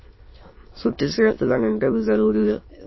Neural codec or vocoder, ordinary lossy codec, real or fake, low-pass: autoencoder, 22.05 kHz, a latent of 192 numbers a frame, VITS, trained on many speakers; MP3, 24 kbps; fake; 7.2 kHz